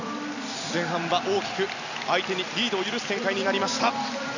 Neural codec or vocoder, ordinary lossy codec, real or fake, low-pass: none; none; real; 7.2 kHz